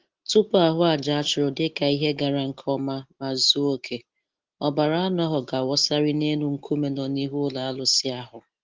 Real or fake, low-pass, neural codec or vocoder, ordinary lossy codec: real; 7.2 kHz; none; Opus, 16 kbps